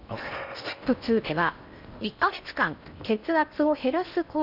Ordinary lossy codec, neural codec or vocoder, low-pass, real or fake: none; codec, 16 kHz in and 24 kHz out, 0.8 kbps, FocalCodec, streaming, 65536 codes; 5.4 kHz; fake